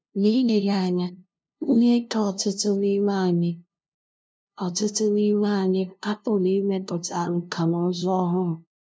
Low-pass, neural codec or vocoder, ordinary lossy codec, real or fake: none; codec, 16 kHz, 0.5 kbps, FunCodec, trained on LibriTTS, 25 frames a second; none; fake